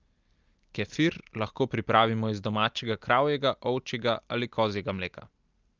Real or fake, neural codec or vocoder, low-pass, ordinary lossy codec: real; none; 7.2 kHz; Opus, 32 kbps